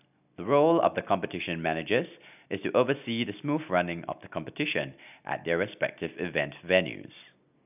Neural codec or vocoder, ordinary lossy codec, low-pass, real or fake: none; none; 3.6 kHz; real